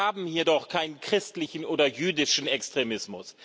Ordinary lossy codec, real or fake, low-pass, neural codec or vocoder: none; real; none; none